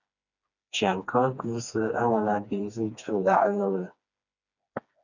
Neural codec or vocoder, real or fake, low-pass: codec, 16 kHz, 2 kbps, FreqCodec, smaller model; fake; 7.2 kHz